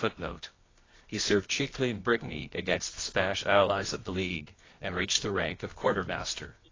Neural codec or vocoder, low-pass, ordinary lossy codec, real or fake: codec, 24 kHz, 0.9 kbps, WavTokenizer, medium music audio release; 7.2 kHz; AAC, 32 kbps; fake